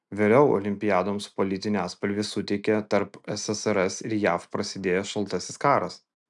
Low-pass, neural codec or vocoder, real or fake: 10.8 kHz; none; real